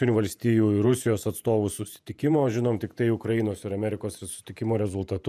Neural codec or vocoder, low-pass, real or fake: vocoder, 48 kHz, 128 mel bands, Vocos; 14.4 kHz; fake